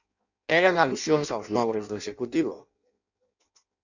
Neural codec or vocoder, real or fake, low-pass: codec, 16 kHz in and 24 kHz out, 0.6 kbps, FireRedTTS-2 codec; fake; 7.2 kHz